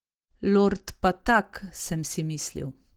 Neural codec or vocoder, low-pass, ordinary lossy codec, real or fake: none; 19.8 kHz; Opus, 16 kbps; real